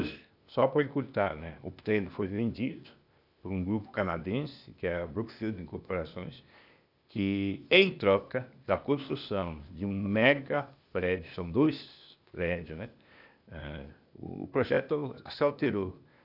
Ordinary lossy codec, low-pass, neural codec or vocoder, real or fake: none; 5.4 kHz; codec, 16 kHz, 0.8 kbps, ZipCodec; fake